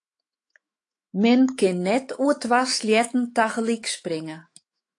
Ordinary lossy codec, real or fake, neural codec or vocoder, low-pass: AAC, 48 kbps; fake; autoencoder, 48 kHz, 128 numbers a frame, DAC-VAE, trained on Japanese speech; 10.8 kHz